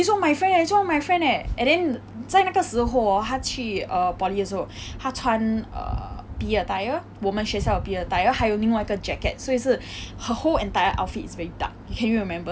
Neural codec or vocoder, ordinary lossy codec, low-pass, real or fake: none; none; none; real